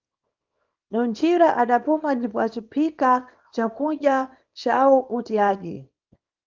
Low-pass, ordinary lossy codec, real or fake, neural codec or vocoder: 7.2 kHz; Opus, 24 kbps; fake; codec, 24 kHz, 0.9 kbps, WavTokenizer, small release